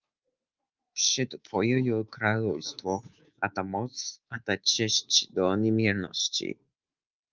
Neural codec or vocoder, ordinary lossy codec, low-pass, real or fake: codec, 24 kHz, 3.1 kbps, DualCodec; Opus, 24 kbps; 7.2 kHz; fake